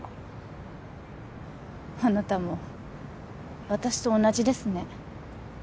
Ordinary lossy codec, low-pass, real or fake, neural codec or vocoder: none; none; real; none